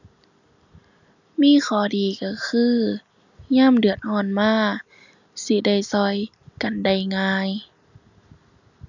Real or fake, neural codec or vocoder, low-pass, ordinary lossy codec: real; none; 7.2 kHz; none